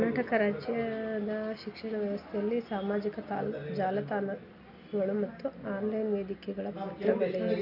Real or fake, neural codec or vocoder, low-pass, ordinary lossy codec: real; none; 5.4 kHz; none